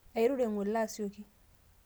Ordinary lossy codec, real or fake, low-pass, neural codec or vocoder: none; real; none; none